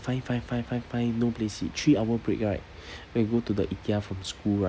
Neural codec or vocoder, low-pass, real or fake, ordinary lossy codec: none; none; real; none